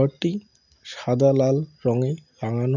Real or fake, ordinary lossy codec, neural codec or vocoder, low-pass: real; none; none; 7.2 kHz